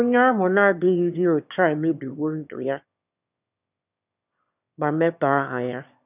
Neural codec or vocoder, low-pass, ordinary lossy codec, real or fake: autoencoder, 22.05 kHz, a latent of 192 numbers a frame, VITS, trained on one speaker; 3.6 kHz; none; fake